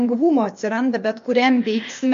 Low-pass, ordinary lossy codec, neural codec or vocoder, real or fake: 7.2 kHz; MP3, 48 kbps; codec, 16 kHz, 0.8 kbps, ZipCodec; fake